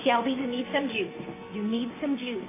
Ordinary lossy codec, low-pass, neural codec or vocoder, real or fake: AAC, 16 kbps; 3.6 kHz; codec, 16 kHz, 6 kbps, DAC; fake